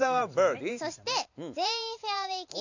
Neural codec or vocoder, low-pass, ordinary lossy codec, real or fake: vocoder, 44.1 kHz, 128 mel bands every 512 samples, BigVGAN v2; 7.2 kHz; none; fake